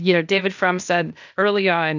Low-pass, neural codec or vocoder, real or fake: 7.2 kHz; codec, 16 kHz, 0.8 kbps, ZipCodec; fake